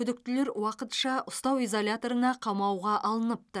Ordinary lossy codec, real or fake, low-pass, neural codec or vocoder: none; real; none; none